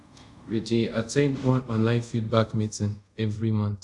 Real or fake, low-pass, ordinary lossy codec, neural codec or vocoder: fake; 10.8 kHz; none; codec, 24 kHz, 0.5 kbps, DualCodec